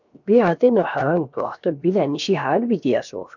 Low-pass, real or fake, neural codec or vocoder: 7.2 kHz; fake; codec, 16 kHz, 0.7 kbps, FocalCodec